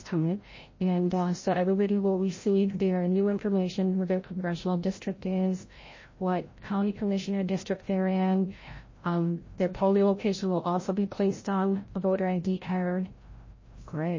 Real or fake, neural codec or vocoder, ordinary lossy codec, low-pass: fake; codec, 16 kHz, 0.5 kbps, FreqCodec, larger model; MP3, 32 kbps; 7.2 kHz